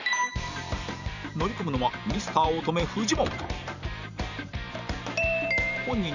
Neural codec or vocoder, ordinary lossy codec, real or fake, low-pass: vocoder, 44.1 kHz, 128 mel bands every 512 samples, BigVGAN v2; none; fake; 7.2 kHz